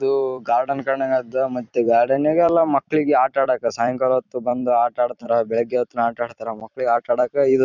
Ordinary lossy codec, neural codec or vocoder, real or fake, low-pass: none; vocoder, 44.1 kHz, 128 mel bands every 256 samples, BigVGAN v2; fake; 7.2 kHz